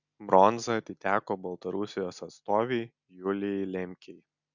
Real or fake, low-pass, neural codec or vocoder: real; 7.2 kHz; none